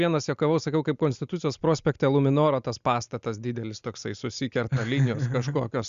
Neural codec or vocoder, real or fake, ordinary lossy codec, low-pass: none; real; Opus, 64 kbps; 7.2 kHz